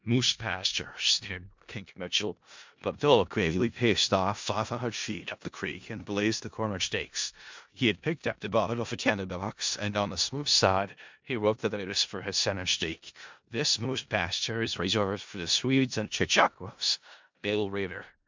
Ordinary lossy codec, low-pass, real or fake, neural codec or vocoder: MP3, 64 kbps; 7.2 kHz; fake; codec, 16 kHz in and 24 kHz out, 0.4 kbps, LongCat-Audio-Codec, four codebook decoder